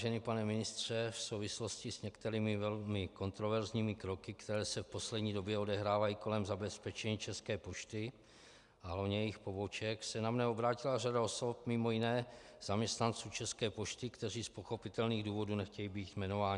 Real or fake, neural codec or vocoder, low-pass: real; none; 10.8 kHz